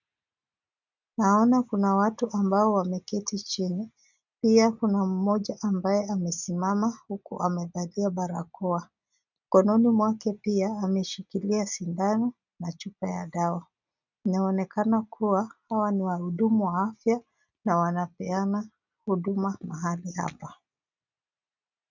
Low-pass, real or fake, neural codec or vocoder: 7.2 kHz; real; none